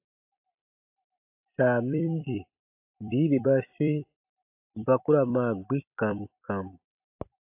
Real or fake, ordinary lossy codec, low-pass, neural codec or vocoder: fake; AAC, 32 kbps; 3.6 kHz; vocoder, 44.1 kHz, 128 mel bands every 256 samples, BigVGAN v2